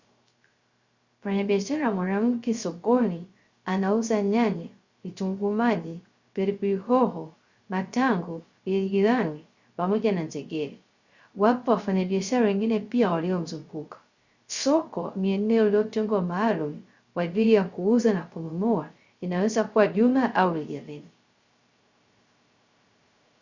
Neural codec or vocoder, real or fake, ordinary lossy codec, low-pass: codec, 16 kHz, 0.3 kbps, FocalCodec; fake; Opus, 64 kbps; 7.2 kHz